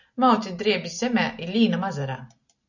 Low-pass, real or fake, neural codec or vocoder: 7.2 kHz; real; none